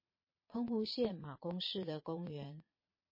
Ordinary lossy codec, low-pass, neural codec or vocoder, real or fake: MP3, 24 kbps; 5.4 kHz; vocoder, 22.05 kHz, 80 mel bands, Vocos; fake